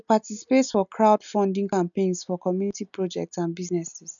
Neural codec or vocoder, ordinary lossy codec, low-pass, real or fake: none; none; 7.2 kHz; real